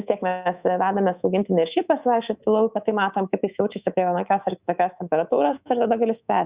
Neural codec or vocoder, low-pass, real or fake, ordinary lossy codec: codec, 24 kHz, 3.1 kbps, DualCodec; 3.6 kHz; fake; Opus, 64 kbps